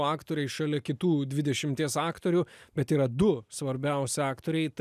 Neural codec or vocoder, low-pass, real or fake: none; 14.4 kHz; real